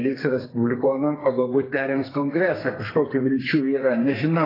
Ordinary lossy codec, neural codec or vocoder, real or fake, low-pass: AAC, 24 kbps; codec, 32 kHz, 1.9 kbps, SNAC; fake; 5.4 kHz